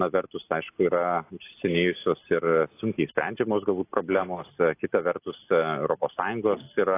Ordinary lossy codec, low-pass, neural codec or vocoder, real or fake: AAC, 24 kbps; 3.6 kHz; none; real